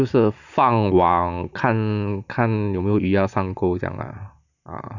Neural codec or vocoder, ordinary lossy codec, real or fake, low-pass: none; none; real; 7.2 kHz